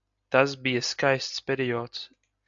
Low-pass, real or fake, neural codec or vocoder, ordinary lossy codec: 7.2 kHz; real; none; AAC, 64 kbps